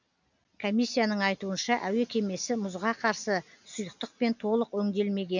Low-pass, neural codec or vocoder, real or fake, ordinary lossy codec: 7.2 kHz; none; real; none